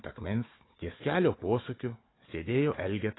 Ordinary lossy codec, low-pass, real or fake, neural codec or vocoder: AAC, 16 kbps; 7.2 kHz; fake; codec, 16 kHz, 4 kbps, FunCodec, trained on Chinese and English, 50 frames a second